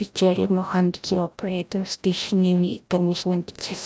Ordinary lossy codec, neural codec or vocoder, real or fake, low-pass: none; codec, 16 kHz, 0.5 kbps, FreqCodec, larger model; fake; none